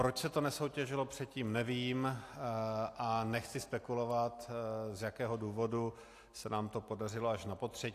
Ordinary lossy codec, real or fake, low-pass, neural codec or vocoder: AAC, 64 kbps; real; 14.4 kHz; none